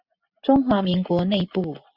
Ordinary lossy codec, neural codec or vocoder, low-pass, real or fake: Opus, 64 kbps; none; 5.4 kHz; real